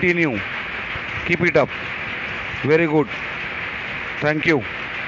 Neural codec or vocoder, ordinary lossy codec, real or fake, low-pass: none; MP3, 48 kbps; real; 7.2 kHz